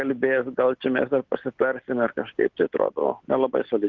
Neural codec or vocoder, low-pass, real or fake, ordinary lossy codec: none; 7.2 kHz; real; Opus, 16 kbps